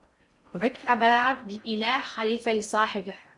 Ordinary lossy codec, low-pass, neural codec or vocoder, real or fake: Opus, 64 kbps; 10.8 kHz; codec, 16 kHz in and 24 kHz out, 0.6 kbps, FocalCodec, streaming, 4096 codes; fake